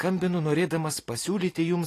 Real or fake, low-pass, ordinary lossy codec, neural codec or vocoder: real; 14.4 kHz; AAC, 48 kbps; none